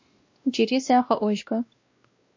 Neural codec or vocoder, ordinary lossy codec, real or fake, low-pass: codec, 16 kHz, 1 kbps, X-Codec, WavLM features, trained on Multilingual LibriSpeech; MP3, 48 kbps; fake; 7.2 kHz